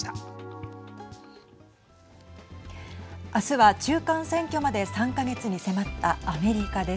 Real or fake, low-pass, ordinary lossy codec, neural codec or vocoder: real; none; none; none